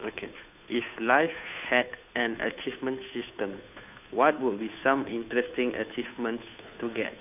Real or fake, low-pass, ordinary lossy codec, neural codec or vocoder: fake; 3.6 kHz; none; codec, 16 kHz, 2 kbps, FunCodec, trained on Chinese and English, 25 frames a second